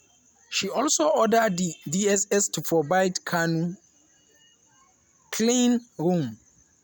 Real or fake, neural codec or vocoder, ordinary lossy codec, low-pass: real; none; none; none